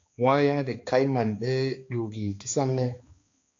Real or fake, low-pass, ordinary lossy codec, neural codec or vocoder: fake; 7.2 kHz; AAC, 48 kbps; codec, 16 kHz, 2 kbps, X-Codec, HuBERT features, trained on balanced general audio